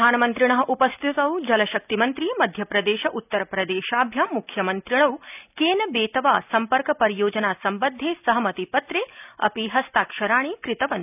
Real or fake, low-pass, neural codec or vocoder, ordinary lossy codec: real; 3.6 kHz; none; none